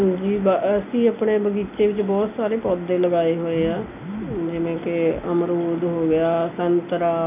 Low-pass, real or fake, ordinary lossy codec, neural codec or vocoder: 3.6 kHz; real; none; none